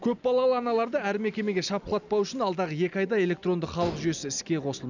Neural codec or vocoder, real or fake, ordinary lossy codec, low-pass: none; real; none; 7.2 kHz